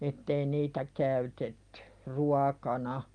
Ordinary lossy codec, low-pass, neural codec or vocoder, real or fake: Opus, 32 kbps; 9.9 kHz; none; real